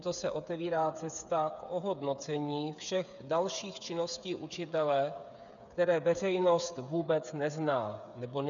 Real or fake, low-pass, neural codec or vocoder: fake; 7.2 kHz; codec, 16 kHz, 8 kbps, FreqCodec, smaller model